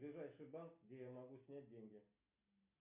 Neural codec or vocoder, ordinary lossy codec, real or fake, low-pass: none; MP3, 32 kbps; real; 3.6 kHz